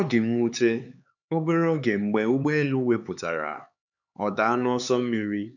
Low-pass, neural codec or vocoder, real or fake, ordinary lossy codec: 7.2 kHz; codec, 16 kHz, 4 kbps, X-Codec, HuBERT features, trained on LibriSpeech; fake; none